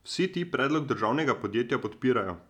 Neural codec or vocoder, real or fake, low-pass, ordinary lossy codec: none; real; 19.8 kHz; none